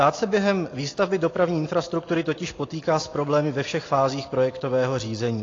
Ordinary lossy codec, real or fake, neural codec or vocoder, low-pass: AAC, 32 kbps; real; none; 7.2 kHz